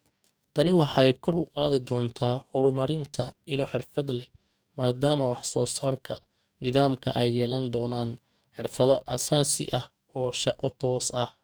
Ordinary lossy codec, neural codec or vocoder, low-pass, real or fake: none; codec, 44.1 kHz, 2.6 kbps, DAC; none; fake